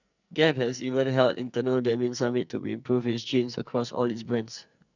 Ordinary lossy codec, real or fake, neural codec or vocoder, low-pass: none; fake; codec, 44.1 kHz, 2.6 kbps, SNAC; 7.2 kHz